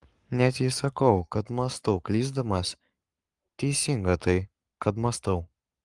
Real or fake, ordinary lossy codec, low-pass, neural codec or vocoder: fake; Opus, 32 kbps; 10.8 kHz; codec, 44.1 kHz, 7.8 kbps, Pupu-Codec